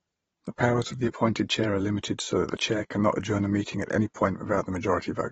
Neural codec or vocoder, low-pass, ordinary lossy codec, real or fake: vocoder, 44.1 kHz, 128 mel bands every 512 samples, BigVGAN v2; 19.8 kHz; AAC, 24 kbps; fake